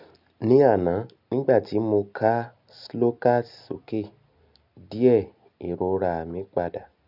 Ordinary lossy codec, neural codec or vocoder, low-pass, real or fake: none; none; 5.4 kHz; real